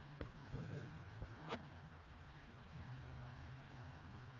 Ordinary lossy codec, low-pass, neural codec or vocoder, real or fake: none; 7.2 kHz; codec, 16 kHz, 2 kbps, FreqCodec, smaller model; fake